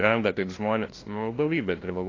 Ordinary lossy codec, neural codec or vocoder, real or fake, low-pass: AAC, 32 kbps; codec, 16 kHz, 0.5 kbps, FunCodec, trained on LibriTTS, 25 frames a second; fake; 7.2 kHz